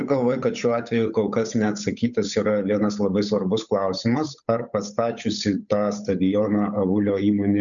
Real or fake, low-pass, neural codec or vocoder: fake; 7.2 kHz; codec, 16 kHz, 8 kbps, FunCodec, trained on Chinese and English, 25 frames a second